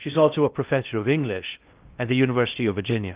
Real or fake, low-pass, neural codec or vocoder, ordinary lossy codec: fake; 3.6 kHz; codec, 16 kHz in and 24 kHz out, 0.6 kbps, FocalCodec, streaming, 4096 codes; Opus, 32 kbps